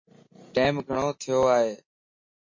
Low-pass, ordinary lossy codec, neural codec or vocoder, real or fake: 7.2 kHz; MP3, 32 kbps; none; real